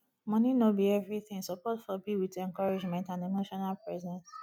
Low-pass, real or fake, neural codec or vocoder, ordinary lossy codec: 19.8 kHz; real; none; none